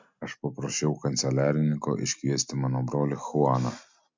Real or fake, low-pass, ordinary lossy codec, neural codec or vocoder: real; 7.2 kHz; MP3, 64 kbps; none